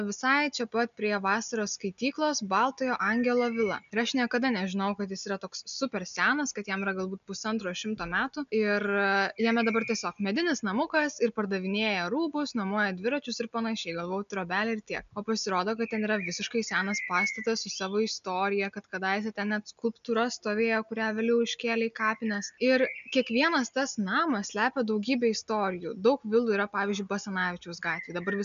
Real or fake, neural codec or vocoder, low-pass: real; none; 7.2 kHz